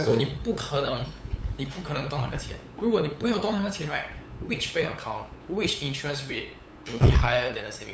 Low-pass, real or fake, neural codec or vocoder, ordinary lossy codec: none; fake; codec, 16 kHz, 8 kbps, FunCodec, trained on LibriTTS, 25 frames a second; none